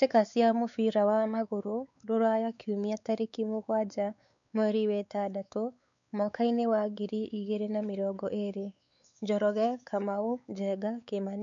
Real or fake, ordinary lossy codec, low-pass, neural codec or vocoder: fake; none; 7.2 kHz; codec, 16 kHz, 4 kbps, X-Codec, WavLM features, trained on Multilingual LibriSpeech